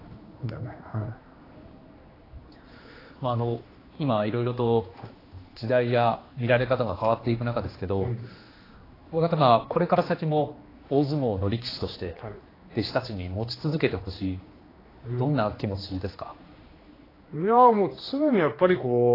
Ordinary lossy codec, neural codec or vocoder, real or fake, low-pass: AAC, 24 kbps; codec, 16 kHz, 2 kbps, X-Codec, HuBERT features, trained on general audio; fake; 5.4 kHz